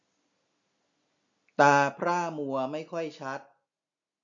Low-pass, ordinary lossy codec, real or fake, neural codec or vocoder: 7.2 kHz; MP3, 64 kbps; real; none